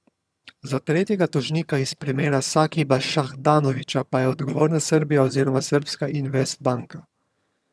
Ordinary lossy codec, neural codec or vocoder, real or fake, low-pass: none; vocoder, 22.05 kHz, 80 mel bands, HiFi-GAN; fake; none